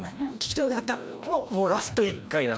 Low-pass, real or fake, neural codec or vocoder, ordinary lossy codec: none; fake; codec, 16 kHz, 1 kbps, FreqCodec, larger model; none